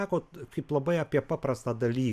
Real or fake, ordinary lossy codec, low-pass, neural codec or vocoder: real; AAC, 96 kbps; 14.4 kHz; none